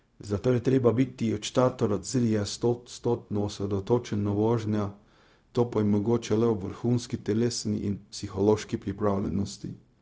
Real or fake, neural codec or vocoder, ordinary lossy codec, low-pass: fake; codec, 16 kHz, 0.4 kbps, LongCat-Audio-Codec; none; none